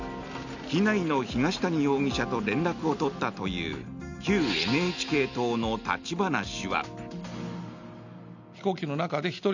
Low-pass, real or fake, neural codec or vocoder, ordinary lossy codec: 7.2 kHz; real; none; none